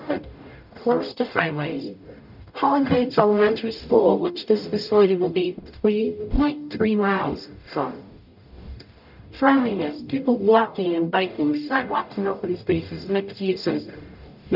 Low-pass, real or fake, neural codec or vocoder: 5.4 kHz; fake; codec, 44.1 kHz, 0.9 kbps, DAC